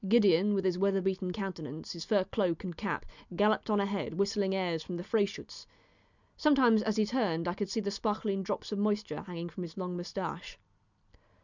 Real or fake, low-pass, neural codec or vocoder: real; 7.2 kHz; none